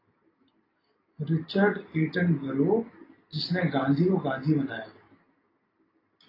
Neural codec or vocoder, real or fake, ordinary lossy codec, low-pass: none; real; AAC, 24 kbps; 5.4 kHz